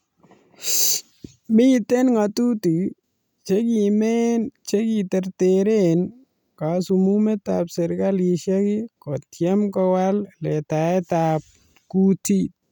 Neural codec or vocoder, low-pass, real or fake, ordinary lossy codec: none; 19.8 kHz; real; none